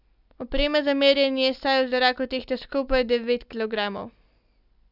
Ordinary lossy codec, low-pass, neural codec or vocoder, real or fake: none; 5.4 kHz; none; real